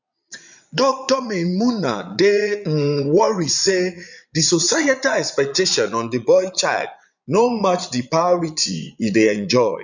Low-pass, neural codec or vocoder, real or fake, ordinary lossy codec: 7.2 kHz; vocoder, 22.05 kHz, 80 mel bands, Vocos; fake; none